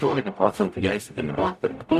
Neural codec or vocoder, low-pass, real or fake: codec, 44.1 kHz, 0.9 kbps, DAC; 14.4 kHz; fake